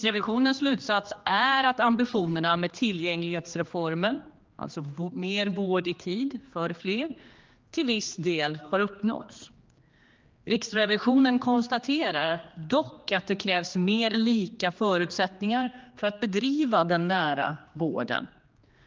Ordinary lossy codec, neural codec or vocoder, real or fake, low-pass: Opus, 24 kbps; codec, 16 kHz, 2 kbps, X-Codec, HuBERT features, trained on general audio; fake; 7.2 kHz